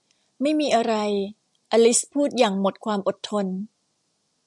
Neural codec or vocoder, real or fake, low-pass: none; real; 10.8 kHz